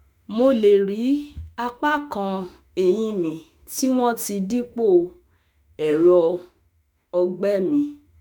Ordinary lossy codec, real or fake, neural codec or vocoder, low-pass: none; fake; autoencoder, 48 kHz, 32 numbers a frame, DAC-VAE, trained on Japanese speech; none